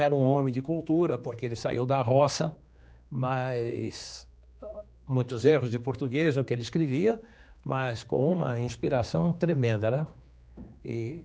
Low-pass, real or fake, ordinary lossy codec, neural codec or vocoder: none; fake; none; codec, 16 kHz, 2 kbps, X-Codec, HuBERT features, trained on general audio